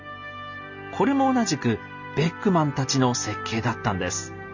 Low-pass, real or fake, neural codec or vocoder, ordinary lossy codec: 7.2 kHz; real; none; none